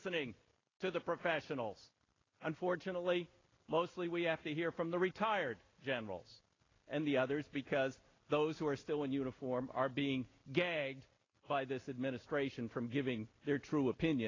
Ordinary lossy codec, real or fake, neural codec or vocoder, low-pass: AAC, 32 kbps; real; none; 7.2 kHz